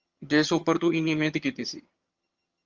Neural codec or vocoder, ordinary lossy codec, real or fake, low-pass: vocoder, 22.05 kHz, 80 mel bands, HiFi-GAN; Opus, 32 kbps; fake; 7.2 kHz